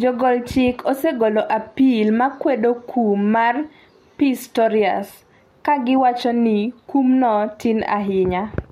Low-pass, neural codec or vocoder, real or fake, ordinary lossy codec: 19.8 kHz; none; real; MP3, 64 kbps